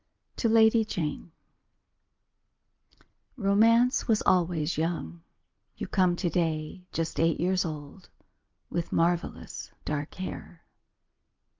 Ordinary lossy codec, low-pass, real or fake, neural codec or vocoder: Opus, 24 kbps; 7.2 kHz; real; none